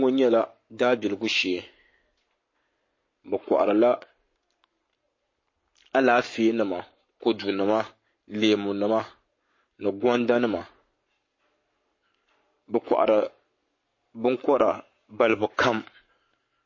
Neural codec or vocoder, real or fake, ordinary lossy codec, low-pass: codec, 44.1 kHz, 7.8 kbps, Pupu-Codec; fake; MP3, 32 kbps; 7.2 kHz